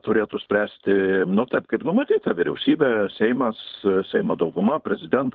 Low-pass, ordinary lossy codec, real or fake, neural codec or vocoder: 7.2 kHz; Opus, 32 kbps; fake; codec, 16 kHz, 4.8 kbps, FACodec